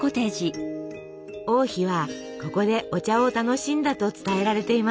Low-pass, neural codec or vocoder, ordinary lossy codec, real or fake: none; none; none; real